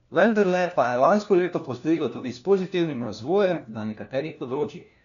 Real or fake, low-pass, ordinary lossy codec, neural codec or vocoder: fake; 7.2 kHz; none; codec, 16 kHz, 1 kbps, FunCodec, trained on LibriTTS, 50 frames a second